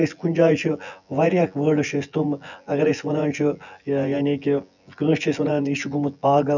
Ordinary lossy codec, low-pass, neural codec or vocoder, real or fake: none; 7.2 kHz; vocoder, 24 kHz, 100 mel bands, Vocos; fake